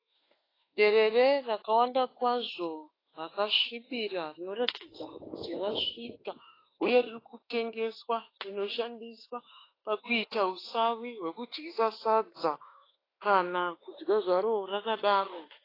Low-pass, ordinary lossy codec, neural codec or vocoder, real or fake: 5.4 kHz; AAC, 24 kbps; autoencoder, 48 kHz, 32 numbers a frame, DAC-VAE, trained on Japanese speech; fake